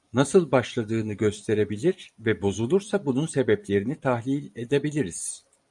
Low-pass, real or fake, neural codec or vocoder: 10.8 kHz; fake; vocoder, 44.1 kHz, 128 mel bands every 256 samples, BigVGAN v2